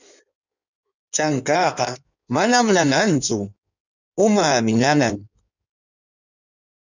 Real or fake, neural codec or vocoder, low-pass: fake; codec, 16 kHz in and 24 kHz out, 1.1 kbps, FireRedTTS-2 codec; 7.2 kHz